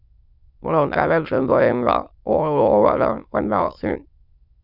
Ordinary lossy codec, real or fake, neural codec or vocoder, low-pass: Opus, 64 kbps; fake; autoencoder, 22.05 kHz, a latent of 192 numbers a frame, VITS, trained on many speakers; 5.4 kHz